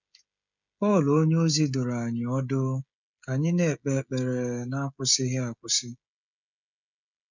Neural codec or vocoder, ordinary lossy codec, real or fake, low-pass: codec, 16 kHz, 16 kbps, FreqCodec, smaller model; none; fake; 7.2 kHz